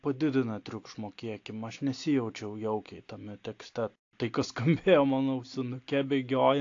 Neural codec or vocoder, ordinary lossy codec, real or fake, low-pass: none; AAC, 48 kbps; real; 7.2 kHz